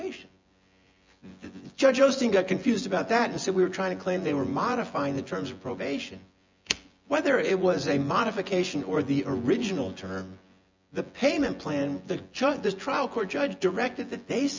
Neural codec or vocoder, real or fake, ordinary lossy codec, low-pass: vocoder, 24 kHz, 100 mel bands, Vocos; fake; MP3, 64 kbps; 7.2 kHz